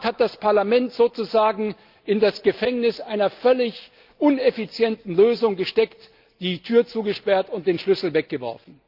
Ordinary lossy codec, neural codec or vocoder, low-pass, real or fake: Opus, 24 kbps; none; 5.4 kHz; real